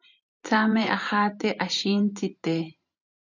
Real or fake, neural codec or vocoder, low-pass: fake; vocoder, 44.1 kHz, 128 mel bands every 512 samples, BigVGAN v2; 7.2 kHz